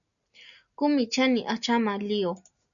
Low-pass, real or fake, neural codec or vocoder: 7.2 kHz; real; none